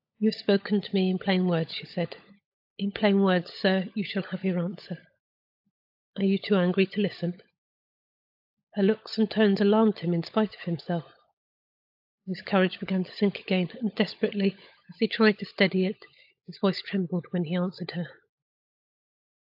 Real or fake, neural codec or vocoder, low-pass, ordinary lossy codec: fake; codec, 16 kHz, 16 kbps, FunCodec, trained on LibriTTS, 50 frames a second; 5.4 kHz; AAC, 48 kbps